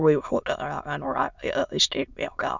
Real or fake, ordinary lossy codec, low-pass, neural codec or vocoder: fake; none; 7.2 kHz; autoencoder, 22.05 kHz, a latent of 192 numbers a frame, VITS, trained on many speakers